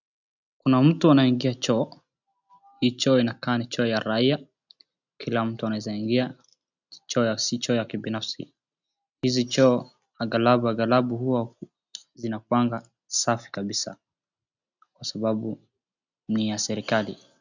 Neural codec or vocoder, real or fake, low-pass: none; real; 7.2 kHz